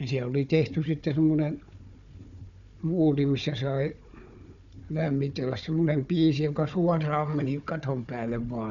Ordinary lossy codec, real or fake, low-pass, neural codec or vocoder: none; fake; 7.2 kHz; codec, 16 kHz, 4 kbps, FunCodec, trained on Chinese and English, 50 frames a second